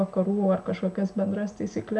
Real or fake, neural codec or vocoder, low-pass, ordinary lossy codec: real; none; 10.8 kHz; MP3, 96 kbps